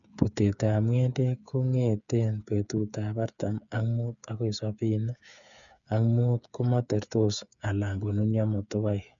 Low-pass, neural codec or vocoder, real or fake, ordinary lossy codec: 7.2 kHz; codec, 16 kHz, 8 kbps, FreqCodec, smaller model; fake; none